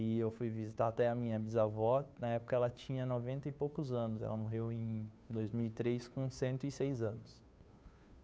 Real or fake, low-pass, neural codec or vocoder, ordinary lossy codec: fake; none; codec, 16 kHz, 2 kbps, FunCodec, trained on Chinese and English, 25 frames a second; none